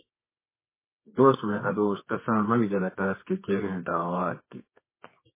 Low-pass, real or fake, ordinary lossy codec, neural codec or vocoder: 3.6 kHz; fake; MP3, 16 kbps; codec, 24 kHz, 0.9 kbps, WavTokenizer, medium music audio release